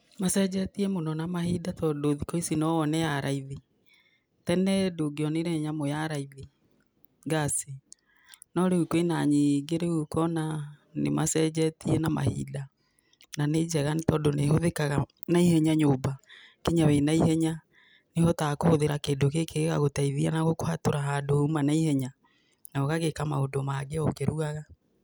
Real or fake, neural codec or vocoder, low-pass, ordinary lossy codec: real; none; none; none